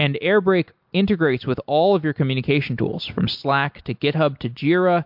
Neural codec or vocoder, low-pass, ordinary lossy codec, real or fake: none; 5.4 kHz; MP3, 48 kbps; real